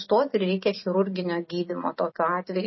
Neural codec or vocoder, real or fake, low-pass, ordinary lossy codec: none; real; 7.2 kHz; MP3, 24 kbps